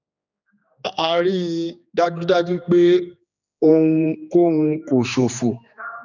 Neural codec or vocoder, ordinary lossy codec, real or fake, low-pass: codec, 16 kHz, 2 kbps, X-Codec, HuBERT features, trained on general audio; none; fake; 7.2 kHz